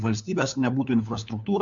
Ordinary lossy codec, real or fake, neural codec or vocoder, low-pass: MP3, 64 kbps; fake; codec, 16 kHz, 4 kbps, FunCodec, trained on LibriTTS, 50 frames a second; 7.2 kHz